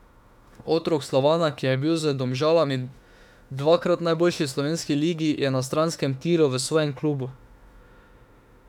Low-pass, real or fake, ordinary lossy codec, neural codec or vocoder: 19.8 kHz; fake; none; autoencoder, 48 kHz, 32 numbers a frame, DAC-VAE, trained on Japanese speech